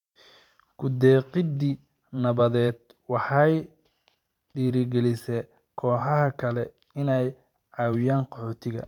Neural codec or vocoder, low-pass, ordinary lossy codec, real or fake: vocoder, 44.1 kHz, 128 mel bands every 512 samples, BigVGAN v2; 19.8 kHz; MP3, 96 kbps; fake